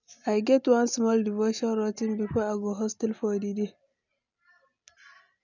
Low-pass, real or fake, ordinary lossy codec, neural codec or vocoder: 7.2 kHz; real; none; none